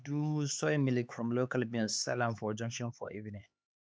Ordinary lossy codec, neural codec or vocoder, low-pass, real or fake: none; codec, 16 kHz, 4 kbps, X-Codec, HuBERT features, trained on LibriSpeech; none; fake